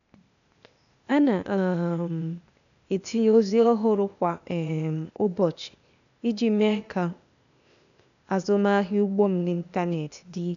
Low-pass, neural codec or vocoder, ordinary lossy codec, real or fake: 7.2 kHz; codec, 16 kHz, 0.8 kbps, ZipCodec; none; fake